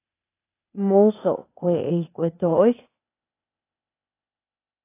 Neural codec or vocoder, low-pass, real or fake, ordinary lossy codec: codec, 16 kHz, 0.8 kbps, ZipCodec; 3.6 kHz; fake; AAC, 24 kbps